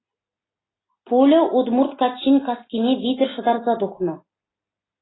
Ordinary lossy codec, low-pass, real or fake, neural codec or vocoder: AAC, 16 kbps; 7.2 kHz; real; none